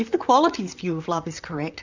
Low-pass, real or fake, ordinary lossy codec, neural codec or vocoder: 7.2 kHz; fake; Opus, 64 kbps; vocoder, 44.1 kHz, 128 mel bands, Pupu-Vocoder